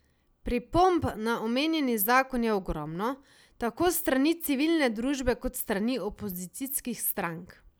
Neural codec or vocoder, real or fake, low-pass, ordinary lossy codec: none; real; none; none